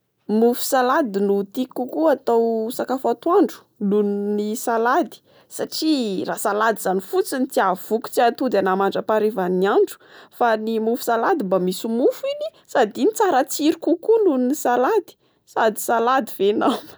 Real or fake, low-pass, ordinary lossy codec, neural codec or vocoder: real; none; none; none